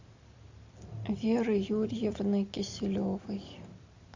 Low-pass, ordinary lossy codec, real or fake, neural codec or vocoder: 7.2 kHz; AAC, 48 kbps; real; none